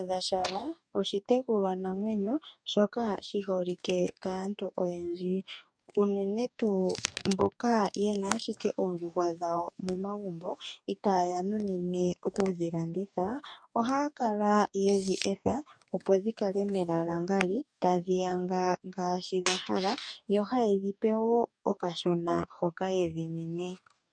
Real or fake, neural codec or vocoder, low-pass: fake; codec, 44.1 kHz, 2.6 kbps, DAC; 9.9 kHz